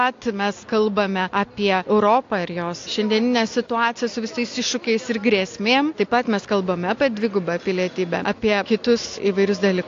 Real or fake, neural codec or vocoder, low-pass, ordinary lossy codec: real; none; 7.2 kHz; AAC, 48 kbps